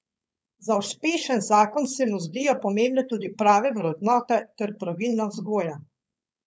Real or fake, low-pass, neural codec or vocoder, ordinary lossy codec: fake; none; codec, 16 kHz, 4.8 kbps, FACodec; none